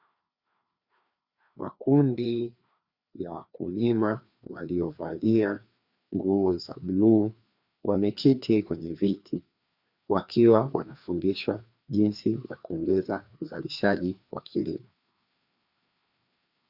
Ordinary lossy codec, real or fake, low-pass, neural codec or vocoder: Opus, 64 kbps; fake; 5.4 kHz; codec, 16 kHz, 2 kbps, FreqCodec, larger model